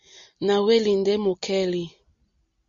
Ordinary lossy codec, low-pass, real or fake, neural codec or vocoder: Opus, 64 kbps; 7.2 kHz; real; none